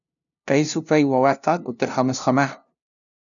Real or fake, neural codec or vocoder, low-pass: fake; codec, 16 kHz, 0.5 kbps, FunCodec, trained on LibriTTS, 25 frames a second; 7.2 kHz